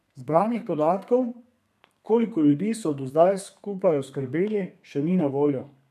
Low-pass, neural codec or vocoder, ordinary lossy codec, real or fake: 14.4 kHz; codec, 32 kHz, 1.9 kbps, SNAC; none; fake